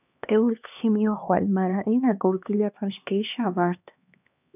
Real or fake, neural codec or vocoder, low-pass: fake; codec, 16 kHz, 2 kbps, X-Codec, HuBERT features, trained on LibriSpeech; 3.6 kHz